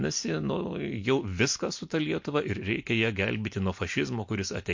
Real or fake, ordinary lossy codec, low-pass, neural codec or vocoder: real; MP3, 48 kbps; 7.2 kHz; none